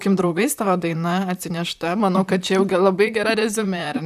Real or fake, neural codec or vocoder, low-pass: fake; vocoder, 44.1 kHz, 128 mel bands, Pupu-Vocoder; 14.4 kHz